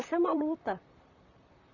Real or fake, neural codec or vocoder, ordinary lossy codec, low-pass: fake; vocoder, 44.1 kHz, 128 mel bands, Pupu-Vocoder; none; 7.2 kHz